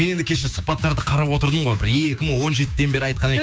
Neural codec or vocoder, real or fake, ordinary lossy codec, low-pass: codec, 16 kHz, 6 kbps, DAC; fake; none; none